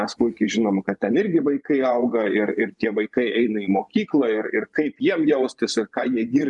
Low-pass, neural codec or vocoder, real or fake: 10.8 kHz; vocoder, 24 kHz, 100 mel bands, Vocos; fake